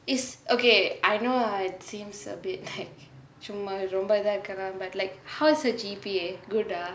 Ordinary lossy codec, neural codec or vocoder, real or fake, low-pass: none; none; real; none